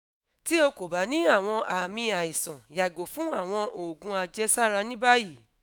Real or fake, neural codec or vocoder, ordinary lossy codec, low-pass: fake; autoencoder, 48 kHz, 128 numbers a frame, DAC-VAE, trained on Japanese speech; none; none